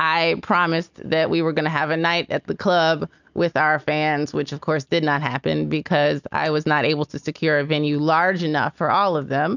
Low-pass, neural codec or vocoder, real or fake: 7.2 kHz; none; real